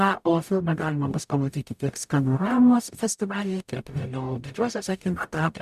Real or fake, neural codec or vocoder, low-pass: fake; codec, 44.1 kHz, 0.9 kbps, DAC; 14.4 kHz